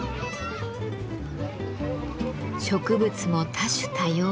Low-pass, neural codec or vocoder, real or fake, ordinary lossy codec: none; none; real; none